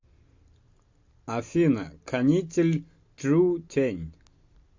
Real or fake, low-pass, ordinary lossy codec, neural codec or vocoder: real; 7.2 kHz; MP3, 48 kbps; none